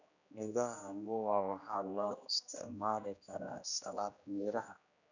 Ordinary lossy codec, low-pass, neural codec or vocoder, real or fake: none; 7.2 kHz; codec, 16 kHz, 1 kbps, X-Codec, HuBERT features, trained on general audio; fake